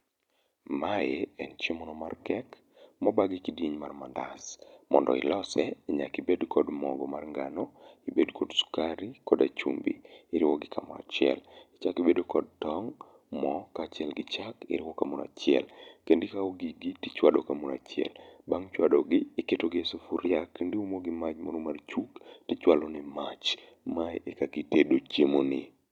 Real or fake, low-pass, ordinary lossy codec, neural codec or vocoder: fake; 19.8 kHz; none; vocoder, 44.1 kHz, 128 mel bands every 256 samples, BigVGAN v2